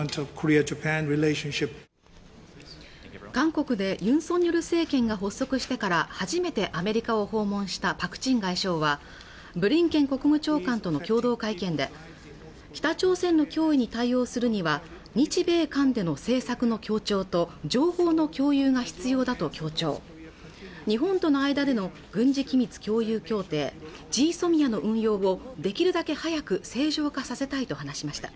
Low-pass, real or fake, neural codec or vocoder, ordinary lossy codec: none; real; none; none